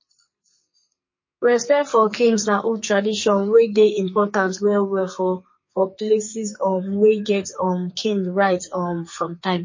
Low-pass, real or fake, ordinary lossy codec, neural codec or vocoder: 7.2 kHz; fake; MP3, 32 kbps; codec, 44.1 kHz, 2.6 kbps, SNAC